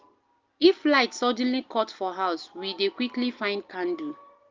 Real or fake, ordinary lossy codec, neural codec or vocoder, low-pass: real; Opus, 32 kbps; none; 7.2 kHz